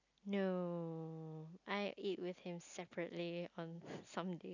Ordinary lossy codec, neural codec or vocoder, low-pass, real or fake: none; none; 7.2 kHz; real